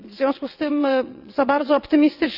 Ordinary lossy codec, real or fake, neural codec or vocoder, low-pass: none; fake; vocoder, 22.05 kHz, 80 mel bands, WaveNeXt; 5.4 kHz